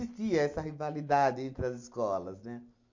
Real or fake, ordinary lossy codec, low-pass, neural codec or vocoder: real; MP3, 48 kbps; 7.2 kHz; none